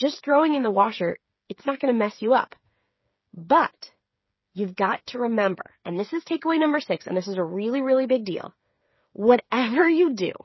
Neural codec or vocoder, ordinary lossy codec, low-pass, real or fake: codec, 16 kHz, 8 kbps, FreqCodec, smaller model; MP3, 24 kbps; 7.2 kHz; fake